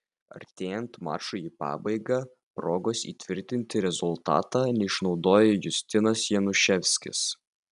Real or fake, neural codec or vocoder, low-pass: real; none; 10.8 kHz